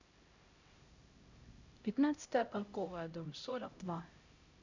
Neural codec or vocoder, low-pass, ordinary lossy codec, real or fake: codec, 16 kHz, 0.5 kbps, X-Codec, HuBERT features, trained on LibriSpeech; 7.2 kHz; Opus, 64 kbps; fake